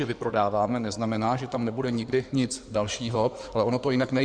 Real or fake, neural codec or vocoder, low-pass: fake; codec, 16 kHz in and 24 kHz out, 2.2 kbps, FireRedTTS-2 codec; 9.9 kHz